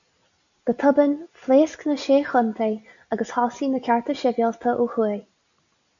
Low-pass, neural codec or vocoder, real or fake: 7.2 kHz; none; real